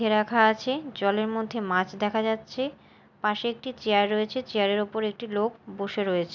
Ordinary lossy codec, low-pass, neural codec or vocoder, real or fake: none; 7.2 kHz; none; real